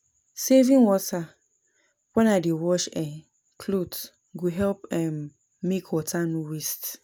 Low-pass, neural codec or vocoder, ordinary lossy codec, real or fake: none; none; none; real